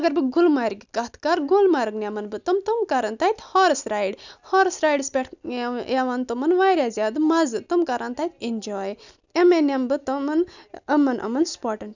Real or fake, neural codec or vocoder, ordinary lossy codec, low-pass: real; none; none; 7.2 kHz